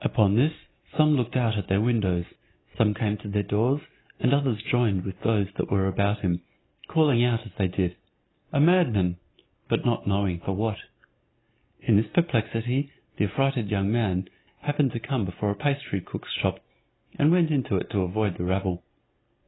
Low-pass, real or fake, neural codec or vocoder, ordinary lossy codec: 7.2 kHz; real; none; AAC, 16 kbps